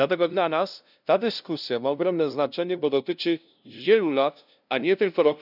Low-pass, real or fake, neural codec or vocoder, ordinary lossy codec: 5.4 kHz; fake; codec, 16 kHz, 0.5 kbps, FunCodec, trained on LibriTTS, 25 frames a second; none